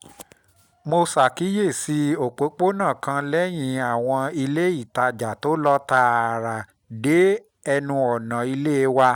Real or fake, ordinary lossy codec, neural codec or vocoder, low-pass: real; none; none; 19.8 kHz